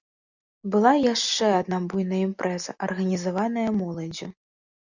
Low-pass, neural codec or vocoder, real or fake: 7.2 kHz; none; real